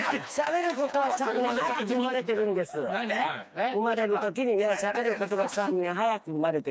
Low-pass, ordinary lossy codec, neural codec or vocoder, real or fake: none; none; codec, 16 kHz, 2 kbps, FreqCodec, smaller model; fake